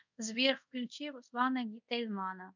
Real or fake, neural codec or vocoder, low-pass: fake; codec, 24 kHz, 0.5 kbps, DualCodec; 7.2 kHz